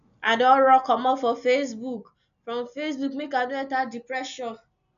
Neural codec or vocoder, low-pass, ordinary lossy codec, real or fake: none; 7.2 kHz; none; real